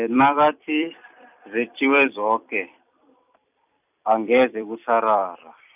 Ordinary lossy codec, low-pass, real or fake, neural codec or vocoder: none; 3.6 kHz; fake; vocoder, 44.1 kHz, 128 mel bands every 256 samples, BigVGAN v2